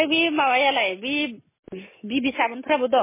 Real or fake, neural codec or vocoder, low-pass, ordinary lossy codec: real; none; 3.6 kHz; MP3, 16 kbps